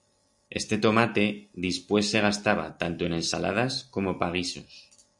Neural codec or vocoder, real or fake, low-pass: none; real; 10.8 kHz